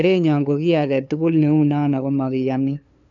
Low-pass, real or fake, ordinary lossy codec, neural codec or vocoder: 7.2 kHz; fake; none; codec, 16 kHz, 2 kbps, FunCodec, trained on LibriTTS, 25 frames a second